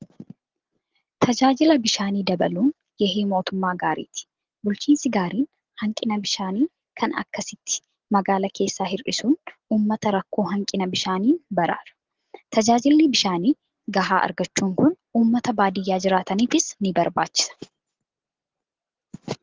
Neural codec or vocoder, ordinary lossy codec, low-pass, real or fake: none; Opus, 16 kbps; 7.2 kHz; real